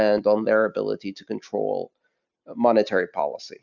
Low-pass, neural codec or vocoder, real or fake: 7.2 kHz; none; real